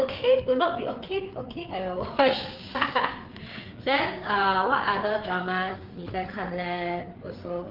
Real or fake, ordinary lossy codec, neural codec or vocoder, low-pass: fake; Opus, 24 kbps; codec, 16 kHz, 4 kbps, FreqCodec, larger model; 5.4 kHz